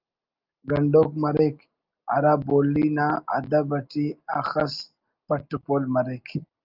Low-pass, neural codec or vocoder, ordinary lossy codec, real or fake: 5.4 kHz; none; Opus, 24 kbps; real